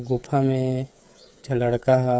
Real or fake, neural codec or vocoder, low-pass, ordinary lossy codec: fake; codec, 16 kHz, 8 kbps, FreqCodec, smaller model; none; none